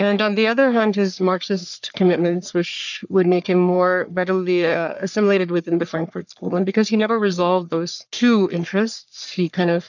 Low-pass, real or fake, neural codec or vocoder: 7.2 kHz; fake; codec, 44.1 kHz, 3.4 kbps, Pupu-Codec